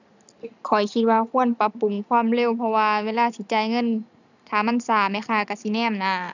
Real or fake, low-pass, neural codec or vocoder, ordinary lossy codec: real; 7.2 kHz; none; none